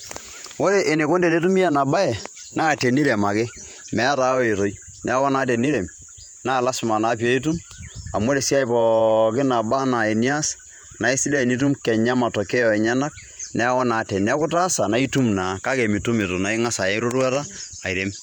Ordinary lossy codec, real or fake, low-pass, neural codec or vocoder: MP3, 96 kbps; fake; 19.8 kHz; vocoder, 48 kHz, 128 mel bands, Vocos